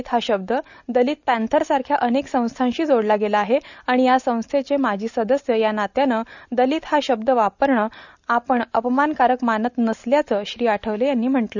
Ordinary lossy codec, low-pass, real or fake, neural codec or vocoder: none; 7.2 kHz; real; none